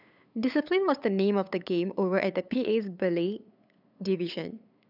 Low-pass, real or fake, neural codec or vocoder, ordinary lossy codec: 5.4 kHz; fake; codec, 16 kHz, 8 kbps, FunCodec, trained on LibriTTS, 25 frames a second; none